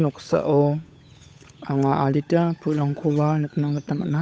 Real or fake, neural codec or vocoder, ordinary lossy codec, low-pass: fake; codec, 16 kHz, 8 kbps, FunCodec, trained on Chinese and English, 25 frames a second; none; none